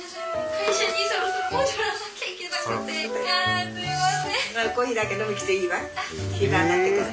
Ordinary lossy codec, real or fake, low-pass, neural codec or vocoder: none; real; none; none